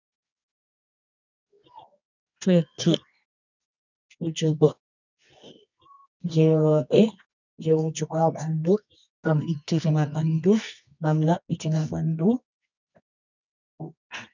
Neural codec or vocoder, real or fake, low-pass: codec, 24 kHz, 0.9 kbps, WavTokenizer, medium music audio release; fake; 7.2 kHz